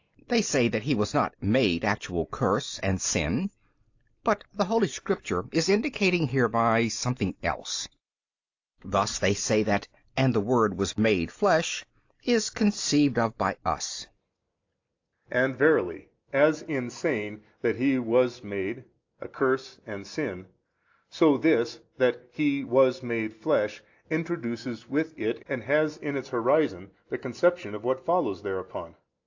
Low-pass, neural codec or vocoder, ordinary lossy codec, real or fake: 7.2 kHz; none; AAC, 48 kbps; real